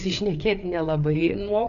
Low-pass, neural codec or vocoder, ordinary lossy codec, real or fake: 7.2 kHz; codec, 16 kHz, 4 kbps, FreqCodec, larger model; MP3, 96 kbps; fake